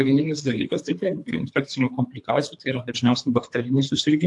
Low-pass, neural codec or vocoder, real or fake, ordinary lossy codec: 10.8 kHz; codec, 24 kHz, 3 kbps, HILCodec; fake; AAC, 64 kbps